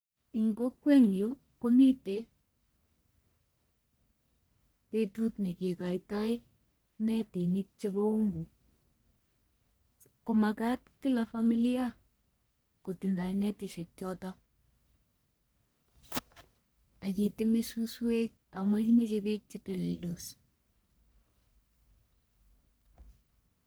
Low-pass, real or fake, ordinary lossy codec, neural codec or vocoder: none; fake; none; codec, 44.1 kHz, 1.7 kbps, Pupu-Codec